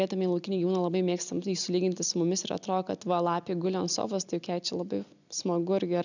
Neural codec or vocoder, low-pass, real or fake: none; 7.2 kHz; real